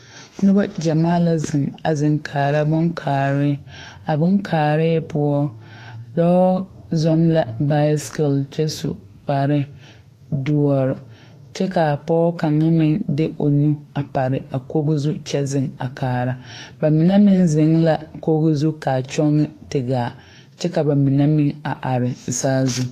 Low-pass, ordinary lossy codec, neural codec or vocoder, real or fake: 14.4 kHz; AAC, 48 kbps; autoencoder, 48 kHz, 32 numbers a frame, DAC-VAE, trained on Japanese speech; fake